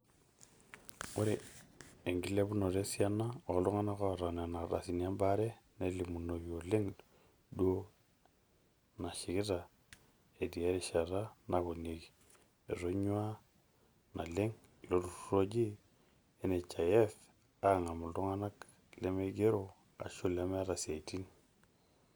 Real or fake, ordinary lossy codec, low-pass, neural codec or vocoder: real; none; none; none